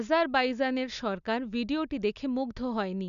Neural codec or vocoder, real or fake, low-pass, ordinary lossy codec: none; real; 7.2 kHz; none